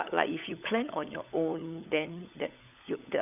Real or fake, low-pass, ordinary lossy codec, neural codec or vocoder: fake; 3.6 kHz; none; codec, 16 kHz, 16 kbps, FunCodec, trained on LibriTTS, 50 frames a second